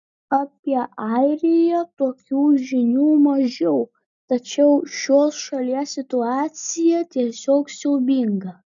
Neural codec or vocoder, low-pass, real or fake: none; 7.2 kHz; real